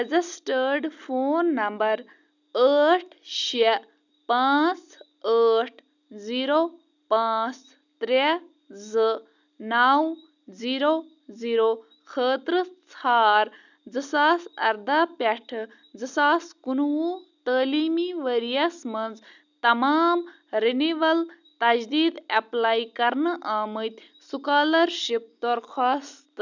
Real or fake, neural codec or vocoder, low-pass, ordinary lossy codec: real; none; 7.2 kHz; none